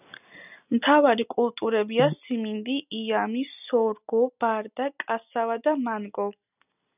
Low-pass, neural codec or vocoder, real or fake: 3.6 kHz; none; real